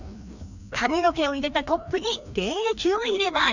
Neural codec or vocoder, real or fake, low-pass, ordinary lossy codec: codec, 16 kHz, 1 kbps, FreqCodec, larger model; fake; 7.2 kHz; none